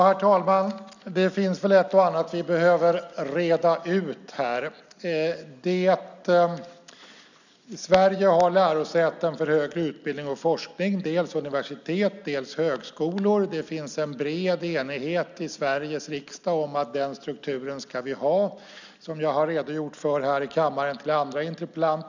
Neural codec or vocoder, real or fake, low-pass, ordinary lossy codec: none; real; 7.2 kHz; none